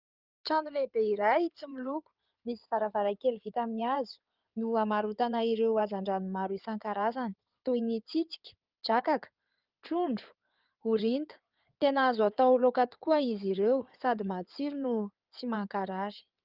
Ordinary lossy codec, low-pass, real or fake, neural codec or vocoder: Opus, 24 kbps; 5.4 kHz; fake; codec, 16 kHz in and 24 kHz out, 2.2 kbps, FireRedTTS-2 codec